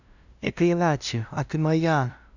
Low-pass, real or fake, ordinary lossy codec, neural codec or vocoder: 7.2 kHz; fake; none; codec, 16 kHz, 0.5 kbps, FunCodec, trained on LibriTTS, 25 frames a second